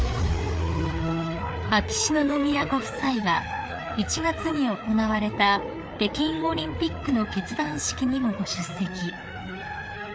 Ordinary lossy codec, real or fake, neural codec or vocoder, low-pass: none; fake; codec, 16 kHz, 4 kbps, FreqCodec, larger model; none